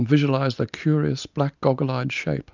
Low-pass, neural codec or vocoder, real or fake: 7.2 kHz; none; real